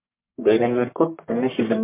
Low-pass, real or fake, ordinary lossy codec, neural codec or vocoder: 3.6 kHz; fake; MP3, 32 kbps; codec, 44.1 kHz, 1.7 kbps, Pupu-Codec